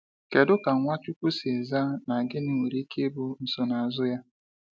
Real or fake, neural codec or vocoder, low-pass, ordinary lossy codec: real; none; none; none